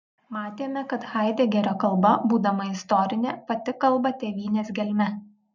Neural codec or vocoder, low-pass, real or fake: none; 7.2 kHz; real